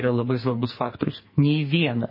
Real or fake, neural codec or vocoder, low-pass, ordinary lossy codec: fake; codec, 44.1 kHz, 2.6 kbps, SNAC; 5.4 kHz; MP3, 24 kbps